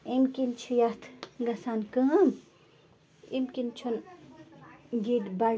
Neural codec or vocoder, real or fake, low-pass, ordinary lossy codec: none; real; none; none